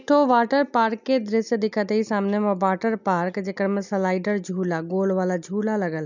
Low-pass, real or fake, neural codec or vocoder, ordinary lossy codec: 7.2 kHz; real; none; none